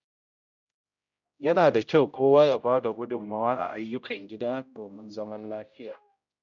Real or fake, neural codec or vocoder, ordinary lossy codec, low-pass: fake; codec, 16 kHz, 0.5 kbps, X-Codec, HuBERT features, trained on general audio; none; 7.2 kHz